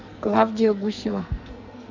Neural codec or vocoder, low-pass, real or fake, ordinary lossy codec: codec, 44.1 kHz, 2.6 kbps, SNAC; 7.2 kHz; fake; Opus, 64 kbps